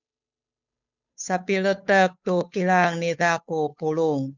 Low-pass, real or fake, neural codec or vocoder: 7.2 kHz; fake; codec, 16 kHz, 8 kbps, FunCodec, trained on Chinese and English, 25 frames a second